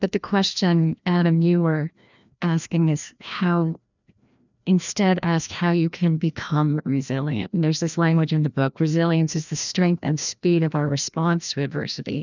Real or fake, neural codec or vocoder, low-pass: fake; codec, 16 kHz, 1 kbps, FreqCodec, larger model; 7.2 kHz